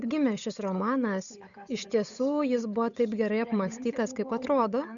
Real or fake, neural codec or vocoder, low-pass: fake; codec, 16 kHz, 8 kbps, FunCodec, trained on Chinese and English, 25 frames a second; 7.2 kHz